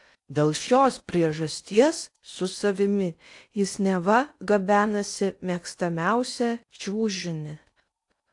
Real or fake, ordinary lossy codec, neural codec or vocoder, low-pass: fake; AAC, 64 kbps; codec, 16 kHz in and 24 kHz out, 0.6 kbps, FocalCodec, streaming, 4096 codes; 10.8 kHz